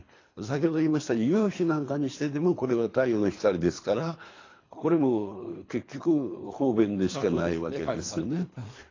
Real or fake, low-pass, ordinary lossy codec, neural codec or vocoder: fake; 7.2 kHz; AAC, 32 kbps; codec, 24 kHz, 3 kbps, HILCodec